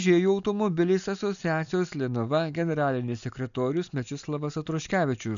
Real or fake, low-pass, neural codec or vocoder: real; 7.2 kHz; none